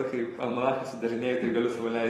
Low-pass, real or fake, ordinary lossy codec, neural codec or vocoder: 19.8 kHz; fake; AAC, 32 kbps; vocoder, 44.1 kHz, 128 mel bands every 256 samples, BigVGAN v2